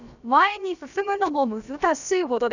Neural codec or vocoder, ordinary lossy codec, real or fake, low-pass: codec, 16 kHz, about 1 kbps, DyCAST, with the encoder's durations; none; fake; 7.2 kHz